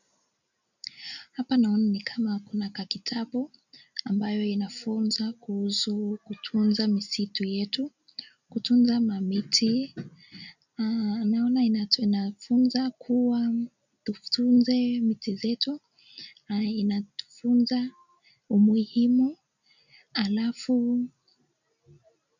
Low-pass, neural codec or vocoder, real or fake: 7.2 kHz; none; real